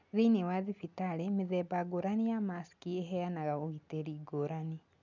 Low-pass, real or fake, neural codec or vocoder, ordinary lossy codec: 7.2 kHz; real; none; none